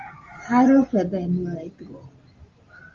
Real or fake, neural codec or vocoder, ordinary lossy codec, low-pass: real; none; Opus, 32 kbps; 7.2 kHz